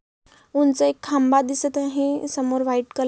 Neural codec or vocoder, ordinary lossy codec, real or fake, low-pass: none; none; real; none